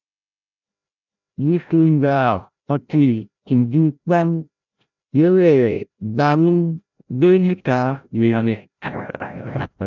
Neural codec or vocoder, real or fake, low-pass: codec, 16 kHz, 0.5 kbps, FreqCodec, larger model; fake; 7.2 kHz